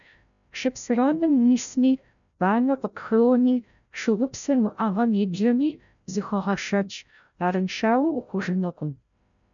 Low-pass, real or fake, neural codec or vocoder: 7.2 kHz; fake; codec, 16 kHz, 0.5 kbps, FreqCodec, larger model